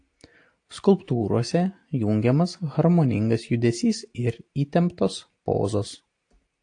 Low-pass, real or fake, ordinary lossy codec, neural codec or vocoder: 9.9 kHz; real; AAC, 48 kbps; none